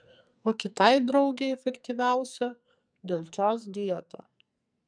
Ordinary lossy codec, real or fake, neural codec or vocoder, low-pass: MP3, 96 kbps; fake; codec, 44.1 kHz, 2.6 kbps, SNAC; 9.9 kHz